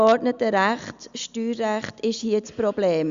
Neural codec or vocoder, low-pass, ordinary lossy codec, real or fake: none; 7.2 kHz; Opus, 64 kbps; real